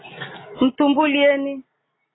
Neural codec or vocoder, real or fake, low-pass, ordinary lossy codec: none; real; 7.2 kHz; AAC, 16 kbps